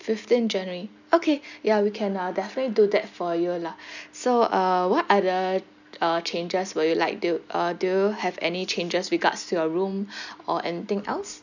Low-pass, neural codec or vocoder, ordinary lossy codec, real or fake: 7.2 kHz; none; none; real